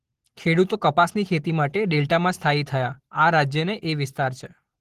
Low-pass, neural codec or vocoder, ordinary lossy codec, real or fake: 14.4 kHz; none; Opus, 16 kbps; real